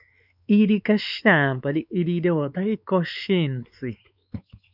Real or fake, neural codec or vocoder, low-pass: fake; codec, 16 kHz, 4 kbps, X-Codec, WavLM features, trained on Multilingual LibriSpeech; 5.4 kHz